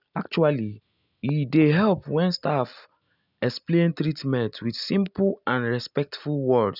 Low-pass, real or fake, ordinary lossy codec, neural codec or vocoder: 5.4 kHz; real; none; none